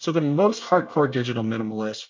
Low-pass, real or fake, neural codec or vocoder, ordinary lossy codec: 7.2 kHz; fake; codec, 24 kHz, 1 kbps, SNAC; MP3, 64 kbps